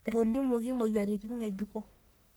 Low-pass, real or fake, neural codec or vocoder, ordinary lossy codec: none; fake; codec, 44.1 kHz, 1.7 kbps, Pupu-Codec; none